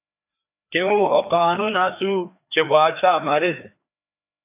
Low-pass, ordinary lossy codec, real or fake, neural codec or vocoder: 3.6 kHz; AAC, 32 kbps; fake; codec, 16 kHz, 2 kbps, FreqCodec, larger model